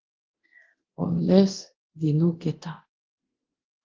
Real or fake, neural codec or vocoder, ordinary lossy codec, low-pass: fake; codec, 24 kHz, 0.9 kbps, DualCodec; Opus, 16 kbps; 7.2 kHz